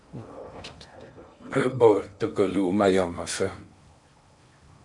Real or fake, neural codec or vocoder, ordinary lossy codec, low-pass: fake; codec, 16 kHz in and 24 kHz out, 0.6 kbps, FocalCodec, streaming, 4096 codes; MP3, 64 kbps; 10.8 kHz